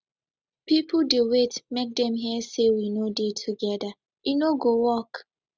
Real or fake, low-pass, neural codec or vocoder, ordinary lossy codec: real; none; none; none